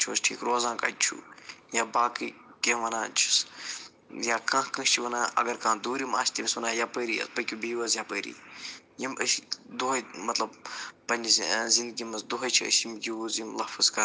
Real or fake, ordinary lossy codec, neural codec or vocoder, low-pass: real; none; none; none